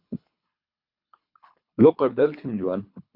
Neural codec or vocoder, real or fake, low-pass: codec, 24 kHz, 3 kbps, HILCodec; fake; 5.4 kHz